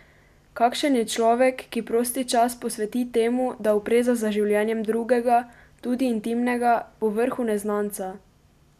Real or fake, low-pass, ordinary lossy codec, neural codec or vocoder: real; 14.4 kHz; none; none